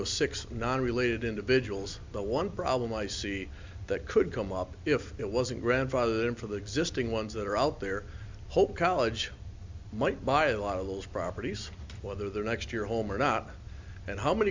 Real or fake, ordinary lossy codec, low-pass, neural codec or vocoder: real; MP3, 64 kbps; 7.2 kHz; none